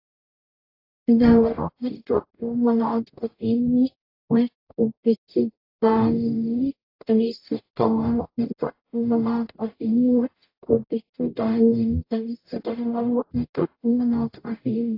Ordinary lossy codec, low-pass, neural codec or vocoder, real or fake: AAC, 32 kbps; 5.4 kHz; codec, 44.1 kHz, 0.9 kbps, DAC; fake